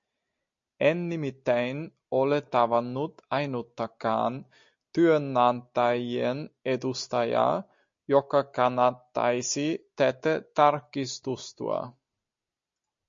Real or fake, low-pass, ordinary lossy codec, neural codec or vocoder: real; 7.2 kHz; MP3, 48 kbps; none